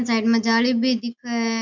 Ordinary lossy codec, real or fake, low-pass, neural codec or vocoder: MP3, 64 kbps; real; 7.2 kHz; none